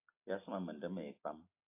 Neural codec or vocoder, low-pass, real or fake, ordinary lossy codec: none; 3.6 kHz; real; AAC, 32 kbps